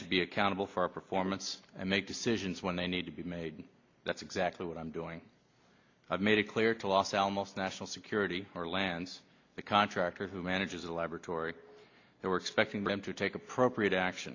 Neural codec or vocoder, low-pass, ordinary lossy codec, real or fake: none; 7.2 kHz; MP3, 48 kbps; real